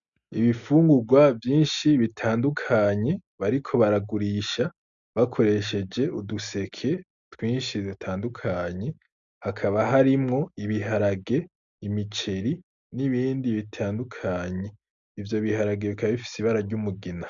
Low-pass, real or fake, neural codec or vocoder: 7.2 kHz; real; none